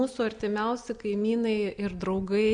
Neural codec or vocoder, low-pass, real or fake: none; 9.9 kHz; real